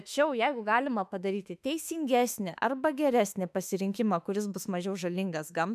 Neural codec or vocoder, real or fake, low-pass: autoencoder, 48 kHz, 32 numbers a frame, DAC-VAE, trained on Japanese speech; fake; 14.4 kHz